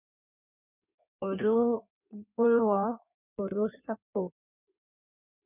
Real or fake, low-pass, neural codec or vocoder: fake; 3.6 kHz; codec, 16 kHz in and 24 kHz out, 1.1 kbps, FireRedTTS-2 codec